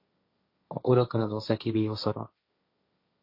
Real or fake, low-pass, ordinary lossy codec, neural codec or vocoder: fake; 5.4 kHz; MP3, 32 kbps; codec, 16 kHz, 1.1 kbps, Voila-Tokenizer